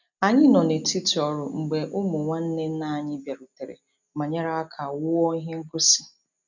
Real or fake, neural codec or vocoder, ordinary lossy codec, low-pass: real; none; none; 7.2 kHz